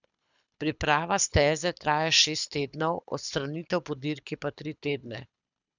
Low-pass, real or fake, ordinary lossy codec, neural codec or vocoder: 7.2 kHz; fake; none; codec, 24 kHz, 6 kbps, HILCodec